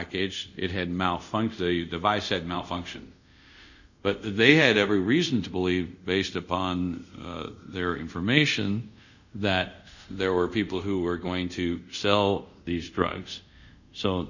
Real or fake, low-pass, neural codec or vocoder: fake; 7.2 kHz; codec, 24 kHz, 0.5 kbps, DualCodec